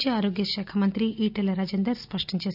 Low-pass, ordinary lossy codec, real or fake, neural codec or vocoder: 5.4 kHz; none; real; none